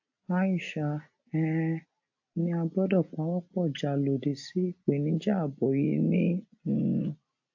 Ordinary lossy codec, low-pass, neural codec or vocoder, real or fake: AAC, 48 kbps; 7.2 kHz; none; real